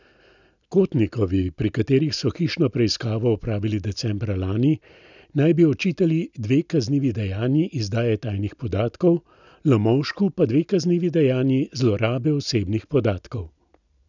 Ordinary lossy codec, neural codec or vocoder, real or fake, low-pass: none; none; real; 7.2 kHz